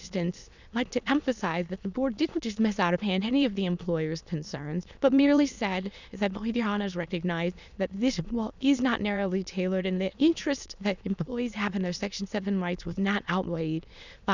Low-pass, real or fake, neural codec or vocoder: 7.2 kHz; fake; autoencoder, 22.05 kHz, a latent of 192 numbers a frame, VITS, trained on many speakers